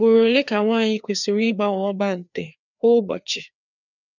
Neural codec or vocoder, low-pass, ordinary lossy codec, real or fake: codec, 16 kHz, 2 kbps, FreqCodec, larger model; 7.2 kHz; none; fake